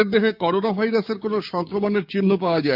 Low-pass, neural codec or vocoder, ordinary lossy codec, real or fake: 5.4 kHz; codec, 16 kHz, 6 kbps, DAC; none; fake